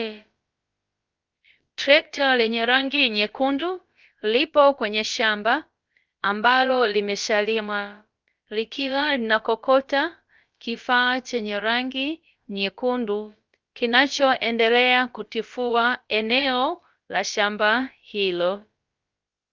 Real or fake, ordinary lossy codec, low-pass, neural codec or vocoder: fake; Opus, 24 kbps; 7.2 kHz; codec, 16 kHz, about 1 kbps, DyCAST, with the encoder's durations